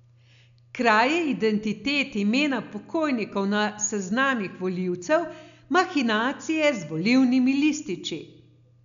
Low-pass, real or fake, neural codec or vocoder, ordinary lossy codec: 7.2 kHz; real; none; none